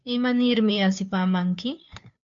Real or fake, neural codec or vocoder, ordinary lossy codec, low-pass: fake; codec, 16 kHz, 2 kbps, FunCodec, trained on Chinese and English, 25 frames a second; AAC, 64 kbps; 7.2 kHz